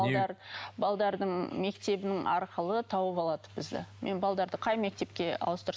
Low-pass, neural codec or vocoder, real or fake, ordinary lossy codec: none; none; real; none